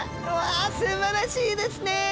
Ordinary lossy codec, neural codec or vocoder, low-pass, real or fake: none; none; none; real